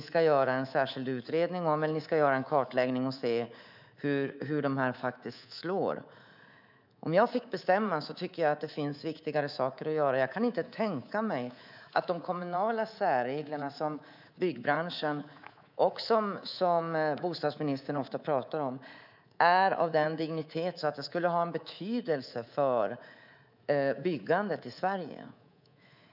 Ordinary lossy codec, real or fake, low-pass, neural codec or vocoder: none; fake; 5.4 kHz; codec, 24 kHz, 3.1 kbps, DualCodec